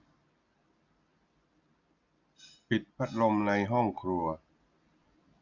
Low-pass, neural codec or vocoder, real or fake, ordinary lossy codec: 7.2 kHz; none; real; none